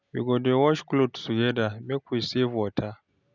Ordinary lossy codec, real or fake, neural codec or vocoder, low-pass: none; real; none; 7.2 kHz